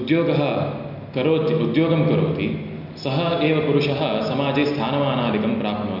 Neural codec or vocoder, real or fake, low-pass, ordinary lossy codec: none; real; 5.4 kHz; none